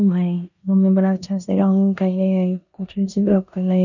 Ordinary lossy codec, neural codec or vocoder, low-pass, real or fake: none; codec, 16 kHz in and 24 kHz out, 0.9 kbps, LongCat-Audio-Codec, four codebook decoder; 7.2 kHz; fake